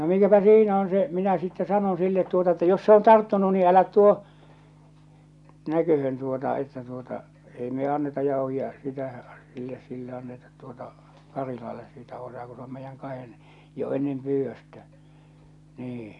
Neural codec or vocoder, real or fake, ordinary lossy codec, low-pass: none; real; none; 10.8 kHz